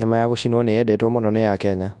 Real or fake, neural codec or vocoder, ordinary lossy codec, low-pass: fake; codec, 24 kHz, 0.9 kbps, WavTokenizer, large speech release; none; 10.8 kHz